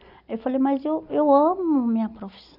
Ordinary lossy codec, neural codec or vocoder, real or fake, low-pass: none; none; real; 5.4 kHz